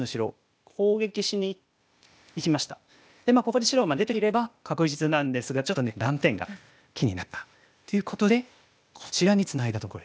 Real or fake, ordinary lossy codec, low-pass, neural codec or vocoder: fake; none; none; codec, 16 kHz, 0.8 kbps, ZipCodec